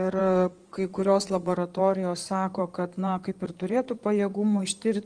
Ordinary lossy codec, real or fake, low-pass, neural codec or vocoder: Opus, 24 kbps; fake; 9.9 kHz; codec, 16 kHz in and 24 kHz out, 2.2 kbps, FireRedTTS-2 codec